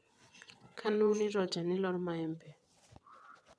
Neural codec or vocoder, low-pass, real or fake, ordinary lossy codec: vocoder, 22.05 kHz, 80 mel bands, WaveNeXt; none; fake; none